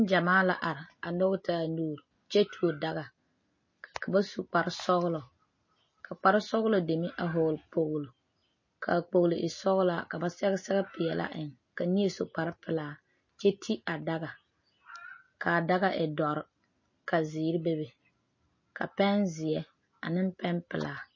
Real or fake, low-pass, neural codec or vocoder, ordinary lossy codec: real; 7.2 kHz; none; MP3, 32 kbps